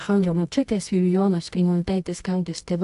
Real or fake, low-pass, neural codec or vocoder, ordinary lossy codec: fake; 10.8 kHz; codec, 24 kHz, 0.9 kbps, WavTokenizer, medium music audio release; AAC, 64 kbps